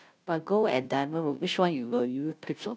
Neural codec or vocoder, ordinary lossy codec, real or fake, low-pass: codec, 16 kHz, 0.5 kbps, FunCodec, trained on Chinese and English, 25 frames a second; none; fake; none